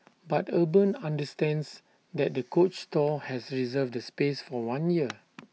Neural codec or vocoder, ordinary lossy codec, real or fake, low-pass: none; none; real; none